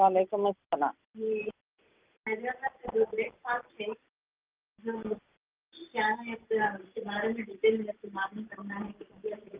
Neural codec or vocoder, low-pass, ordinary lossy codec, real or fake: none; 3.6 kHz; Opus, 32 kbps; real